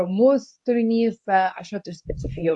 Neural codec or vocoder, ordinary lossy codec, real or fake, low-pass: codec, 24 kHz, 0.9 kbps, WavTokenizer, medium speech release version 2; AAC, 64 kbps; fake; 10.8 kHz